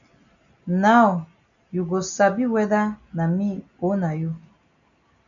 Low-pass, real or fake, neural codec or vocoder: 7.2 kHz; real; none